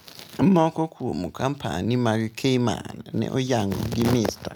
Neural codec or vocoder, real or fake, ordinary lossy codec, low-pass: none; real; none; none